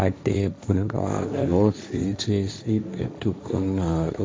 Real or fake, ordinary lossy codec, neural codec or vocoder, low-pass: fake; none; codec, 16 kHz, 1.1 kbps, Voila-Tokenizer; none